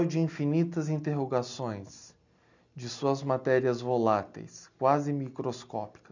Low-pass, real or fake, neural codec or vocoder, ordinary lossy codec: 7.2 kHz; real; none; none